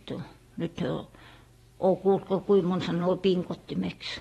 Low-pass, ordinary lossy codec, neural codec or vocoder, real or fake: 19.8 kHz; AAC, 32 kbps; vocoder, 44.1 kHz, 128 mel bands every 512 samples, BigVGAN v2; fake